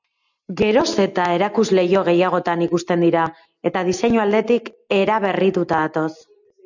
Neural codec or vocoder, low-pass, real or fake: none; 7.2 kHz; real